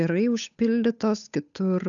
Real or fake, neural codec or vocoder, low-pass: fake; codec, 16 kHz, 8 kbps, FunCodec, trained on Chinese and English, 25 frames a second; 7.2 kHz